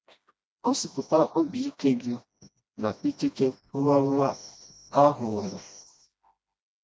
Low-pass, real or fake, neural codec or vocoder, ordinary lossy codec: none; fake; codec, 16 kHz, 1 kbps, FreqCodec, smaller model; none